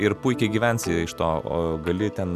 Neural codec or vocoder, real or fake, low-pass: none; real; 14.4 kHz